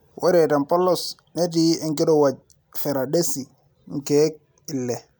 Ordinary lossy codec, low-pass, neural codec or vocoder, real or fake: none; none; none; real